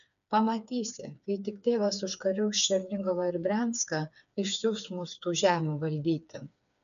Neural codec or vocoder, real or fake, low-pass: codec, 16 kHz, 4 kbps, FreqCodec, smaller model; fake; 7.2 kHz